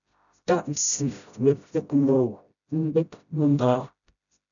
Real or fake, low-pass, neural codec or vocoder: fake; 7.2 kHz; codec, 16 kHz, 0.5 kbps, FreqCodec, smaller model